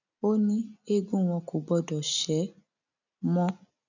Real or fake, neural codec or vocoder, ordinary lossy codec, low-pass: real; none; none; 7.2 kHz